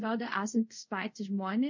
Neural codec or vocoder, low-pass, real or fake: codec, 24 kHz, 0.5 kbps, DualCodec; 7.2 kHz; fake